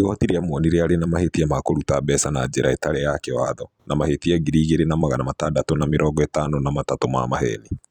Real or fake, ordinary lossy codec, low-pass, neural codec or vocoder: fake; none; 19.8 kHz; vocoder, 44.1 kHz, 128 mel bands every 256 samples, BigVGAN v2